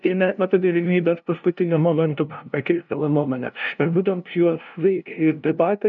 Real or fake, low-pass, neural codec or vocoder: fake; 7.2 kHz; codec, 16 kHz, 0.5 kbps, FunCodec, trained on LibriTTS, 25 frames a second